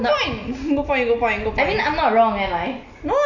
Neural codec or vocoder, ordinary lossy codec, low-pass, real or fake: none; none; 7.2 kHz; real